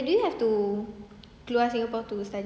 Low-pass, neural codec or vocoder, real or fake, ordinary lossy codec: none; none; real; none